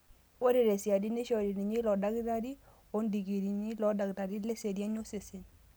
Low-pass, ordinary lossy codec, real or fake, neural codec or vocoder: none; none; real; none